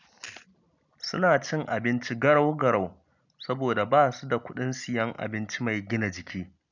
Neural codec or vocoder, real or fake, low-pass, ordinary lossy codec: none; real; 7.2 kHz; none